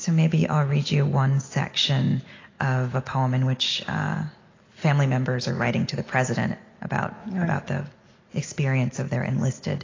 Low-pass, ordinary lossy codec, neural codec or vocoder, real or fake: 7.2 kHz; AAC, 32 kbps; none; real